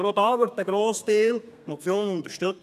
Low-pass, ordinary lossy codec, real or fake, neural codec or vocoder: 14.4 kHz; none; fake; codec, 32 kHz, 1.9 kbps, SNAC